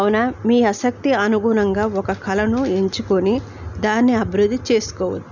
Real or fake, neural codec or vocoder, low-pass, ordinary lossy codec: real; none; 7.2 kHz; none